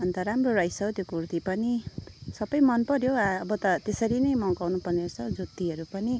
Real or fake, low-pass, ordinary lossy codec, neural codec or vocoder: real; none; none; none